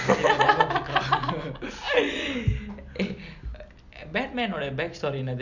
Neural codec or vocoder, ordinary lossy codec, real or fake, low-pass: none; none; real; 7.2 kHz